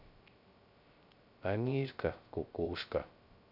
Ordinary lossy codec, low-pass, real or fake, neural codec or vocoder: none; 5.4 kHz; fake; codec, 16 kHz, 0.3 kbps, FocalCodec